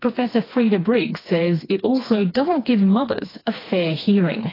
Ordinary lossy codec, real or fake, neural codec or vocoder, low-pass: AAC, 24 kbps; fake; codec, 16 kHz, 2 kbps, FreqCodec, smaller model; 5.4 kHz